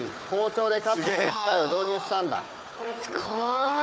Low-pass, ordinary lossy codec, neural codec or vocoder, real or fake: none; none; codec, 16 kHz, 4 kbps, FunCodec, trained on Chinese and English, 50 frames a second; fake